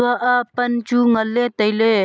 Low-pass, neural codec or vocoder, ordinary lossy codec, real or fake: none; none; none; real